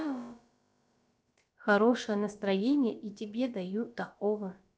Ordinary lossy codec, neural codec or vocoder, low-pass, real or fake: none; codec, 16 kHz, about 1 kbps, DyCAST, with the encoder's durations; none; fake